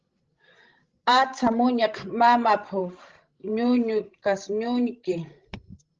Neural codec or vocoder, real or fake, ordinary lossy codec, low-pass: codec, 16 kHz, 16 kbps, FreqCodec, larger model; fake; Opus, 16 kbps; 7.2 kHz